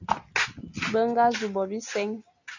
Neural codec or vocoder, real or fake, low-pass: none; real; 7.2 kHz